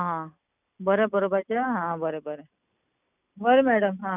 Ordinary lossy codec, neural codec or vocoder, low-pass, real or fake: none; none; 3.6 kHz; real